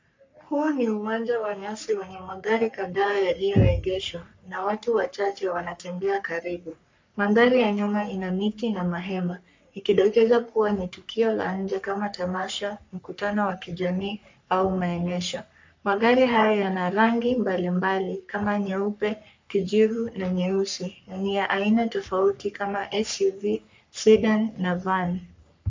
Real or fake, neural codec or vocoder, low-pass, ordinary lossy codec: fake; codec, 44.1 kHz, 3.4 kbps, Pupu-Codec; 7.2 kHz; MP3, 64 kbps